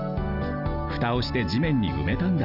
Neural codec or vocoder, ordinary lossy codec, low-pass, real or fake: none; Opus, 24 kbps; 5.4 kHz; real